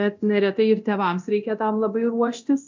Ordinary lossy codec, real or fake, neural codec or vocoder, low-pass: MP3, 64 kbps; fake; codec, 24 kHz, 0.9 kbps, DualCodec; 7.2 kHz